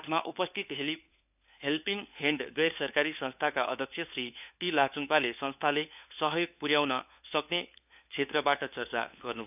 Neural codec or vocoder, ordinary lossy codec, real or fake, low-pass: codec, 16 kHz, 4 kbps, FunCodec, trained on LibriTTS, 50 frames a second; none; fake; 3.6 kHz